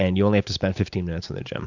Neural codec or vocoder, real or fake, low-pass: none; real; 7.2 kHz